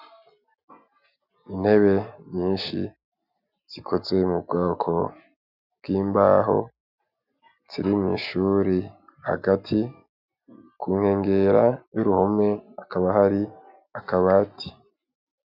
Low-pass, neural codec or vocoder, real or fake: 5.4 kHz; none; real